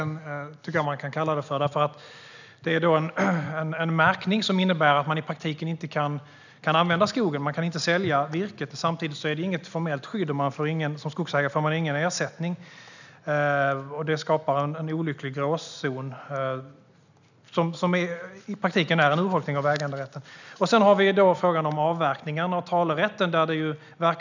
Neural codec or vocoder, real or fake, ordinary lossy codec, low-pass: none; real; none; 7.2 kHz